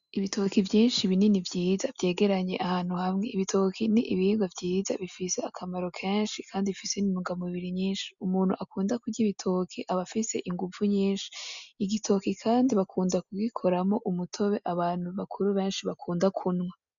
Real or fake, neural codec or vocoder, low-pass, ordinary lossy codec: real; none; 7.2 kHz; MP3, 96 kbps